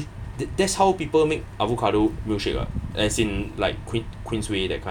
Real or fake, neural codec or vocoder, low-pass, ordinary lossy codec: real; none; 19.8 kHz; none